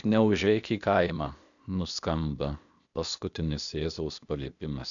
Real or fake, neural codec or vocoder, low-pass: fake; codec, 16 kHz, 0.8 kbps, ZipCodec; 7.2 kHz